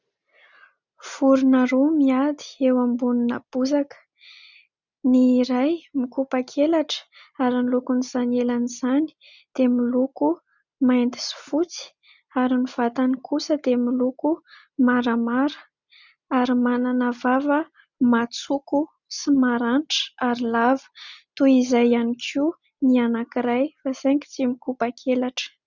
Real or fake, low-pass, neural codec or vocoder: real; 7.2 kHz; none